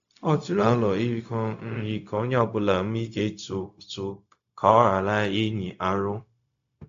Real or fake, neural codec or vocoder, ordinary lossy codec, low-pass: fake; codec, 16 kHz, 0.4 kbps, LongCat-Audio-Codec; AAC, 64 kbps; 7.2 kHz